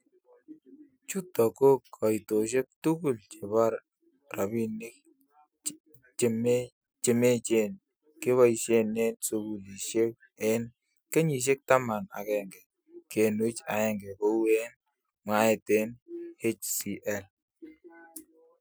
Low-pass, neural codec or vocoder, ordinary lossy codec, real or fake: none; none; none; real